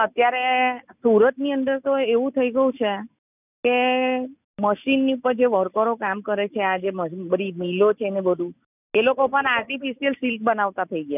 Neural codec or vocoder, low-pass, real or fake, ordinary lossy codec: none; 3.6 kHz; real; none